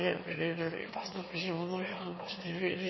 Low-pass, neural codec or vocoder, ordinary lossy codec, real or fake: 7.2 kHz; autoencoder, 22.05 kHz, a latent of 192 numbers a frame, VITS, trained on one speaker; MP3, 24 kbps; fake